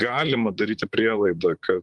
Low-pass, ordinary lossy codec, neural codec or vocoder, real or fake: 10.8 kHz; Opus, 32 kbps; vocoder, 44.1 kHz, 128 mel bands, Pupu-Vocoder; fake